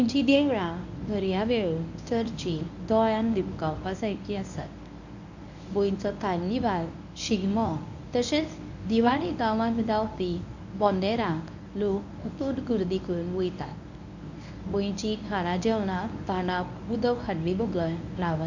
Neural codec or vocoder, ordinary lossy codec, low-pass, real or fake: codec, 24 kHz, 0.9 kbps, WavTokenizer, medium speech release version 1; none; 7.2 kHz; fake